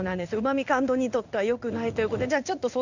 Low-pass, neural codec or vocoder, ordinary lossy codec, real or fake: 7.2 kHz; codec, 16 kHz in and 24 kHz out, 1 kbps, XY-Tokenizer; none; fake